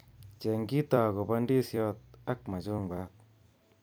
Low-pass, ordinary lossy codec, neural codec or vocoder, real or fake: none; none; vocoder, 44.1 kHz, 128 mel bands every 256 samples, BigVGAN v2; fake